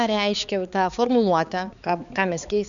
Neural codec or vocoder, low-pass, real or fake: codec, 16 kHz, 4 kbps, X-Codec, HuBERT features, trained on balanced general audio; 7.2 kHz; fake